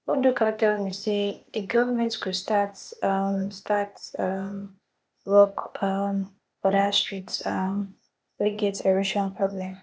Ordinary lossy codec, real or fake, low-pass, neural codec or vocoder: none; fake; none; codec, 16 kHz, 0.8 kbps, ZipCodec